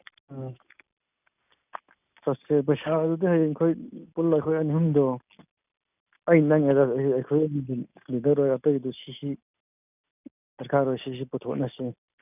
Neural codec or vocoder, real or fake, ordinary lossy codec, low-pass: none; real; none; 3.6 kHz